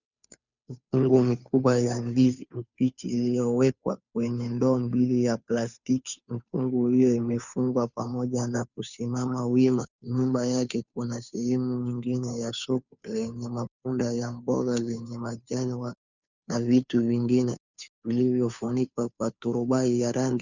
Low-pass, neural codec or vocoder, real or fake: 7.2 kHz; codec, 16 kHz, 2 kbps, FunCodec, trained on Chinese and English, 25 frames a second; fake